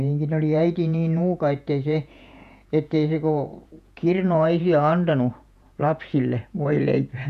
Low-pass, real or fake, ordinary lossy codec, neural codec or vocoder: 14.4 kHz; fake; none; vocoder, 48 kHz, 128 mel bands, Vocos